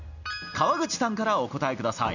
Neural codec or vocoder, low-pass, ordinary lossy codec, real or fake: none; 7.2 kHz; none; real